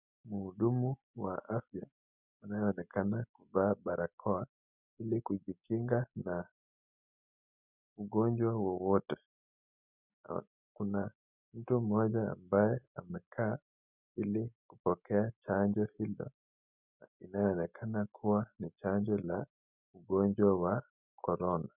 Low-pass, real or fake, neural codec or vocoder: 3.6 kHz; real; none